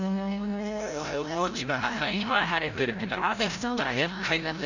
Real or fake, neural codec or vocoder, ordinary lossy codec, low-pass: fake; codec, 16 kHz, 0.5 kbps, FreqCodec, larger model; none; 7.2 kHz